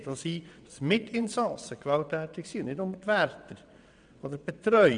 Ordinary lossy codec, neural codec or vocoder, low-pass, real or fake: none; vocoder, 22.05 kHz, 80 mel bands, WaveNeXt; 9.9 kHz; fake